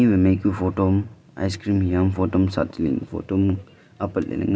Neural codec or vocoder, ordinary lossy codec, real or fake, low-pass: none; none; real; none